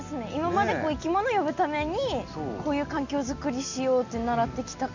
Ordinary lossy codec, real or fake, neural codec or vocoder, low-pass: none; real; none; 7.2 kHz